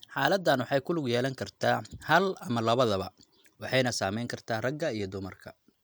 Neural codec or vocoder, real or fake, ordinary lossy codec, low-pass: none; real; none; none